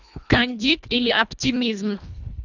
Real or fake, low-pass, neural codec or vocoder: fake; 7.2 kHz; codec, 24 kHz, 1.5 kbps, HILCodec